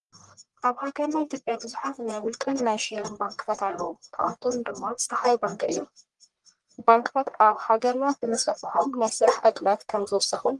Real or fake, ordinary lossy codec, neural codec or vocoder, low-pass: fake; Opus, 32 kbps; codec, 44.1 kHz, 1.7 kbps, Pupu-Codec; 10.8 kHz